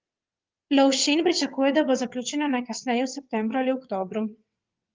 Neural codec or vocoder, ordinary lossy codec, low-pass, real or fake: vocoder, 22.05 kHz, 80 mel bands, WaveNeXt; Opus, 32 kbps; 7.2 kHz; fake